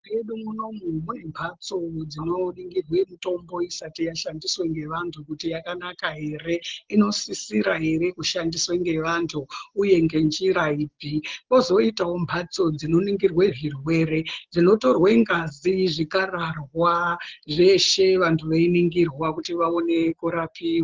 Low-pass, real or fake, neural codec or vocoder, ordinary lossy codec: 7.2 kHz; real; none; Opus, 16 kbps